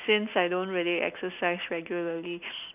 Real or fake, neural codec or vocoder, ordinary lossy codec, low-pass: real; none; none; 3.6 kHz